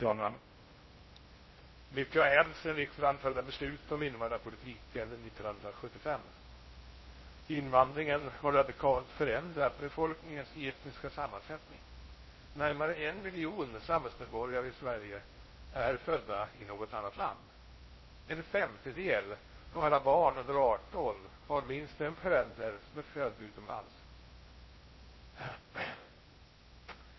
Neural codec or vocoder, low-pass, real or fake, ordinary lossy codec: codec, 16 kHz in and 24 kHz out, 0.6 kbps, FocalCodec, streaming, 2048 codes; 7.2 kHz; fake; MP3, 24 kbps